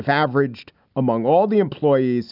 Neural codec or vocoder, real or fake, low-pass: none; real; 5.4 kHz